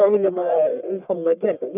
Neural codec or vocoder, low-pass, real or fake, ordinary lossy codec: codec, 44.1 kHz, 1.7 kbps, Pupu-Codec; 3.6 kHz; fake; none